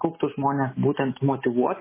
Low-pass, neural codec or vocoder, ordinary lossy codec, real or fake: 3.6 kHz; none; MP3, 16 kbps; real